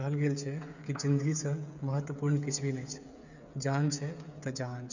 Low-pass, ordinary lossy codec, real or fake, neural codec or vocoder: 7.2 kHz; none; fake; codec, 16 kHz, 8 kbps, FreqCodec, smaller model